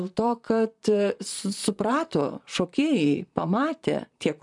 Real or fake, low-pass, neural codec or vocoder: fake; 10.8 kHz; vocoder, 44.1 kHz, 128 mel bands, Pupu-Vocoder